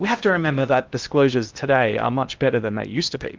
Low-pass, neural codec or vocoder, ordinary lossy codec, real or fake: 7.2 kHz; codec, 16 kHz in and 24 kHz out, 0.8 kbps, FocalCodec, streaming, 65536 codes; Opus, 24 kbps; fake